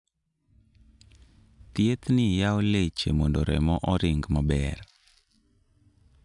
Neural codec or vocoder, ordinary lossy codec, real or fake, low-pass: none; none; real; 10.8 kHz